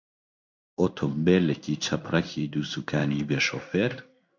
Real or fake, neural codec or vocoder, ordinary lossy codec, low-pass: fake; codec, 16 kHz in and 24 kHz out, 1 kbps, XY-Tokenizer; MP3, 64 kbps; 7.2 kHz